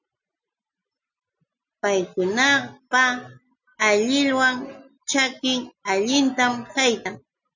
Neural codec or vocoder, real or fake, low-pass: none; real; 7.2 kHz